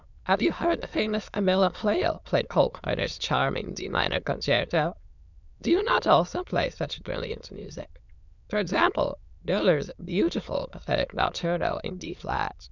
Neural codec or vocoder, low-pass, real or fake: autoencoder, 22.05 kHz, a latent of 192 numbers a frame, VITS, trained on many speakers; 7.2 kHz; fake